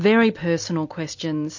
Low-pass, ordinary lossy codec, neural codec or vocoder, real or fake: 7.2 kHz; MP3, 48 kbps; none; real